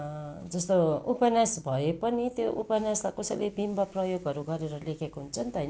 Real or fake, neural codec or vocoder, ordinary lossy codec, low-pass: real; none; none; none